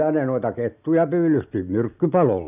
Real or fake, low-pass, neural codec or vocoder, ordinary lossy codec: real; 3.6 kHz; none; none